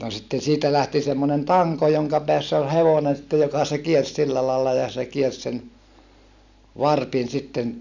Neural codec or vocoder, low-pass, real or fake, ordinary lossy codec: none; 7.2 kHz; real; none